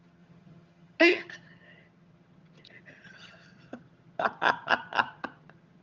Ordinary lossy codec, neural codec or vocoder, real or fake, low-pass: Opus, 32 kbps; vocoder, 22.05 kHz, 80 mel bands, HiFi-GAN; fake; 7.2 kHz